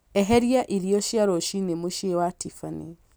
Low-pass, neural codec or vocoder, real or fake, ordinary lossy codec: none; none; real; none